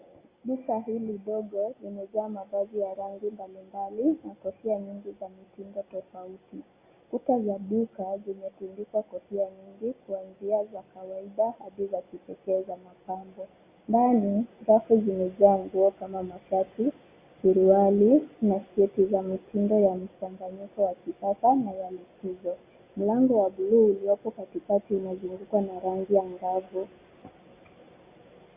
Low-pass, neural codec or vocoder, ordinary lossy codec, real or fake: 3.6 kHz; none; Opus, 64 kbps; real